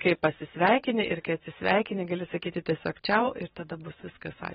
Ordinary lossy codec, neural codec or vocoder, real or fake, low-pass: AAC, 16 kbps; vocoder, 44.1 kHz, 128 mel bands every 512 samples, BigVGAN v2; fake; 19.8 kHz